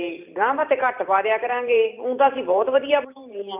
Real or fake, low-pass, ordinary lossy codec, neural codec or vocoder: fake; 3.6 kHz; none; vocoder, 44.1 kHz, 128 mel bands every 512 samples, BigVGAN v2